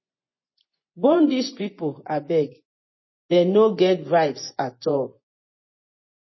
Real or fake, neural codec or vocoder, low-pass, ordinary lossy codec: fake; vocoder, 24 kHz, 100 mel bands, Vocos; 7.2 kHz; MP3, 24 kbps